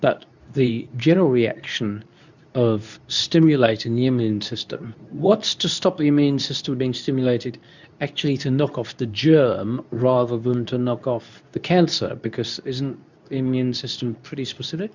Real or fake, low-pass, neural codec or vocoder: fake; 7.2 kHz; codec, 24 kHz, 0.9 kbps, WavTokenizer, medium speech release version 2